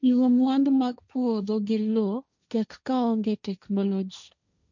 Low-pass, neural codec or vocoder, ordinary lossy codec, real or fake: 7.2 kHz; codec, 16 kHz, 1.1 kbps, Voila-Tokenizer; none; fake